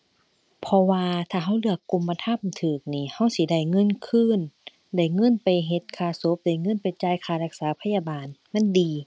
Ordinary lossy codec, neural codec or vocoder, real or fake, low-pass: none; none; real; none